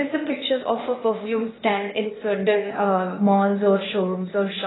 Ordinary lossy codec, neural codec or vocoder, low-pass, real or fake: AAC, 16 kbps; codec, 16 kHz, 0.8 kbps, ZipCodec; 7.2 kHz; fake